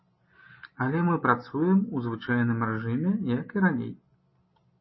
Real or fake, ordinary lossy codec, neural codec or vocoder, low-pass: real; MP3, 24 kbps; none; 7.2 kHz